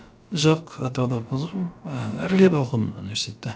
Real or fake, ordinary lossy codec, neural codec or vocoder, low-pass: fake; none; codec, 16 kHz, about 1 kbps, DyCAST, with the encoder's durations; none